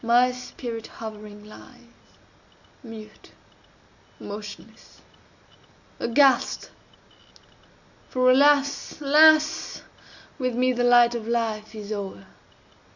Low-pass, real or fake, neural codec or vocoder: 7.2 kHz; real; none